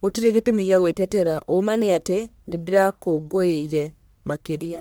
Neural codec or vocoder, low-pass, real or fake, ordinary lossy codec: codec, 44.1 kHz, 1.7 kbps, Pupu-Codec; none; fake; none